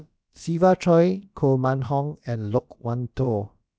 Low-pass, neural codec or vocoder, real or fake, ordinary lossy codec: none; codec, 16 kHz, about 1 kbps, DyCAST, with the encoder's durations; fake; none